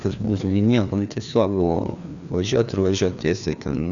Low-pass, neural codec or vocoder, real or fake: 7.2 kHz; codec, 16 kHz, 2 kbps, FreqCodec, larger model; fake